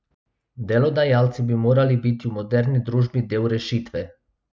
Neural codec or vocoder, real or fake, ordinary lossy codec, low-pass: none; real; none; none